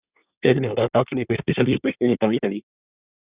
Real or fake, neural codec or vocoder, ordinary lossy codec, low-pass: fake; codec, 24 kHz, 1 kbps, SNAC; Opus, 64 kbps; 3.6 kHz